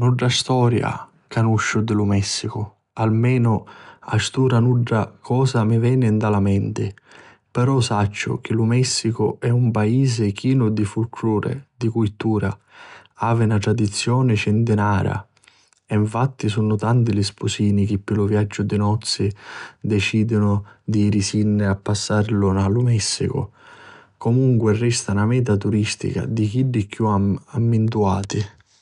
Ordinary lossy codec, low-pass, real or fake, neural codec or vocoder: none; 10.8 kHz; real; none